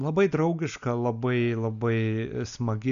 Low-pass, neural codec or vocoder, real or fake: 7.2 kHz; none; real